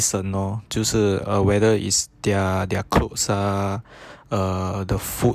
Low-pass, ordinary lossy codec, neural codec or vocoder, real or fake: 14.4 kHz; none; none; real